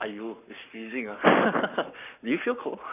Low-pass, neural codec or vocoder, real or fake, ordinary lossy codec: 3.6 kHz; codec, 44.1 kHz, 7.8 kbps, Pupu-Codec; fake; none